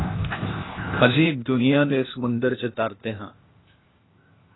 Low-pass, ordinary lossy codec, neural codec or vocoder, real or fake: 7.2 kHz; AAC, 16 kbps; codec, 16 kHz, 0.8 kbps, ZipCodec; fake